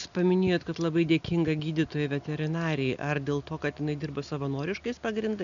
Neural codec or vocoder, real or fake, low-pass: none; real; 7.2 kHz